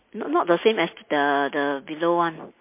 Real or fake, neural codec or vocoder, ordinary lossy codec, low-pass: real; none; MP3, 24 kbps; 3.6 kHz